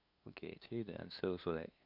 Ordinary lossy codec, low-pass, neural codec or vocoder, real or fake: none; 5.4 kHz; codec, 16 kHz, 2 kbps, FunCodec, trained on LibriTTS, 25 frames a second; fake